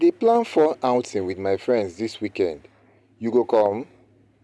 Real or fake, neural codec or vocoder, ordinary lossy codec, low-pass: real; none; none; none